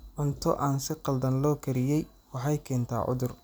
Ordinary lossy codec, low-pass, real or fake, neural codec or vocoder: none; none; real; none